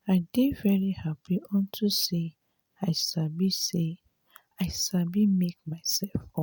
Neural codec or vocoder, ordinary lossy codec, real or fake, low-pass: none; none; real; none